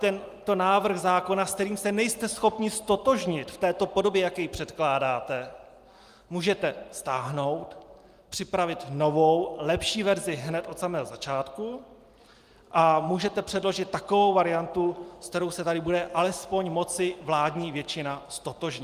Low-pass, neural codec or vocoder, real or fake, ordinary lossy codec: 14.4 kHz; none; real; Opus, 32 kbps